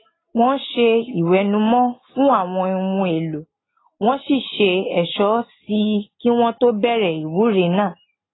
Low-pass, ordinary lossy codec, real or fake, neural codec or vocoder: 7.2 kHz; AAC, 16 kbps; real; none